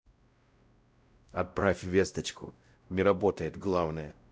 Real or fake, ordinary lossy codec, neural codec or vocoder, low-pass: fake; none; codec, 16 kHz, 0.5 kbps, X-Codec, WavLM features, trained on Multilingual LibriSpeech; none